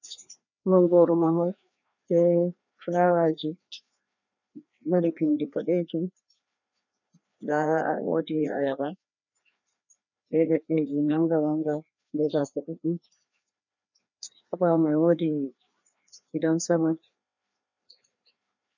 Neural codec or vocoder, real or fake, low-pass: codec, 16 kHz, 2 kbps, FreqCodec, larger model; fake; 7.2 kHz